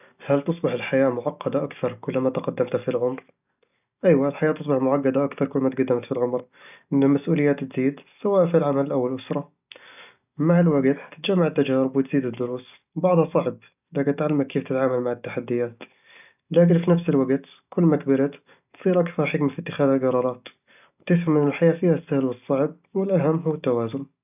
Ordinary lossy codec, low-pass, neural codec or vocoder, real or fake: none; 3.6 kHz; none; real